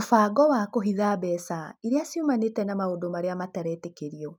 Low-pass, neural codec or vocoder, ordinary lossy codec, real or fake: none; none; none; real